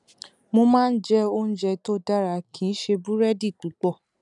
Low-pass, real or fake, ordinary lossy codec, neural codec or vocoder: 10.8 kHz; real; none; none